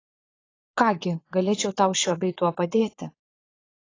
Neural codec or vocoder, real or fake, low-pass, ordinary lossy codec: vocoder, 22.05 kHz, 80 mel bands, Vocos; fake; 7.2 kHz; AAC, 32 kbps